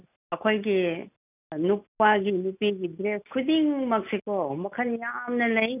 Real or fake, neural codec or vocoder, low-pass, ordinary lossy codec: real; none; 3.6 kHz; none